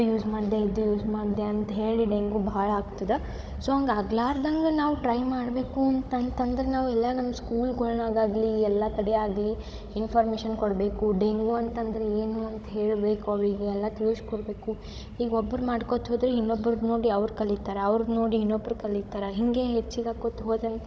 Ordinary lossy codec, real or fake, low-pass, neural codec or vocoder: none; fake; none; codec, 16 kHz, 16 kbps, FunCodec, trained on Chinese and English, 50 frames a second